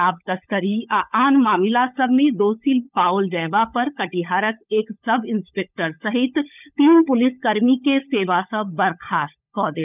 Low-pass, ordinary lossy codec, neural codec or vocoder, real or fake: 3.6 kHz; none; codec, 16 kHz, 16 kbps, FunCodec, trained on LibriTTS, 50 frames a second; fake